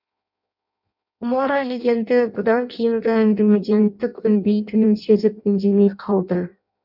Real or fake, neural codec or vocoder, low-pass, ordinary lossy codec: fake; codec, 16 kHz in and 24 kHz out, 0.6 kbps, FireRedTTS-2 codec; 5.4 kHz; none